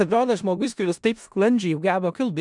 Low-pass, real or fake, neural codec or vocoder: 10.8 kHz; fake; codec, 16 kHz in and 24 kHz out, 0.4 kbps, LongCat-Audio-Codec, four codebook decoder